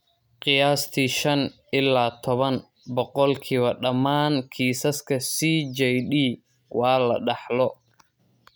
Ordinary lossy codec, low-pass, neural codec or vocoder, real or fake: none; none; none; real